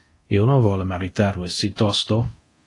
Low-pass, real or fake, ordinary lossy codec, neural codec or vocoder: 10.8 kHz; fake; AAC, 48 kbps; codec, 24 kHz, 0.9 kbps, DualCodec